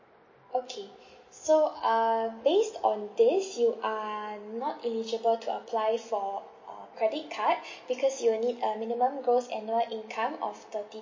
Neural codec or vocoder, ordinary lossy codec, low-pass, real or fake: none; MP3, 32 kbps; 7.2 kHz; real